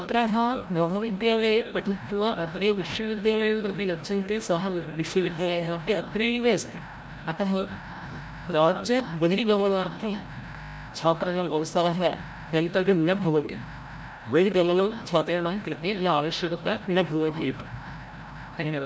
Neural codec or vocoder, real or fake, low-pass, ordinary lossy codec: codec, 16 kHz, 0.5 kbps, FreqCodec, larger model; fake; none; none